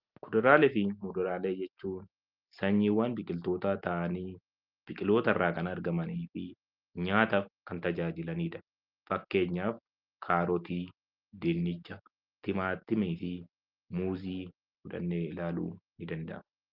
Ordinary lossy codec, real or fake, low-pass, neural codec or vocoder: Opus, 24 kbps; real; 5.4 kHz; none